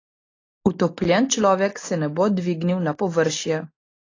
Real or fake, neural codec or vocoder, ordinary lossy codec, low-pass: real; none; AAC, 32 kbps; 7.2 kHz